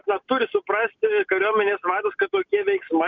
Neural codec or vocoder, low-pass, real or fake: none; 7.2 kHz; real